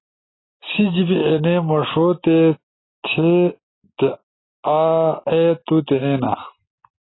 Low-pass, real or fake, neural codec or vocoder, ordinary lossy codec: 7.2 kHz; real; none; AAC, 16 kbps